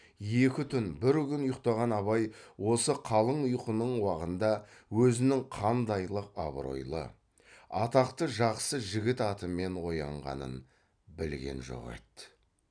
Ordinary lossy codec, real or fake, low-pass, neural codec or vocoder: none; real; 9.9 kHz; none